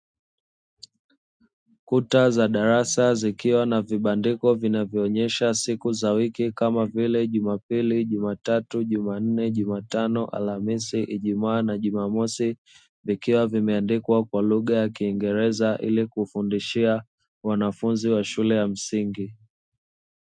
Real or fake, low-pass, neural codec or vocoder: real; 9.9 kHz; none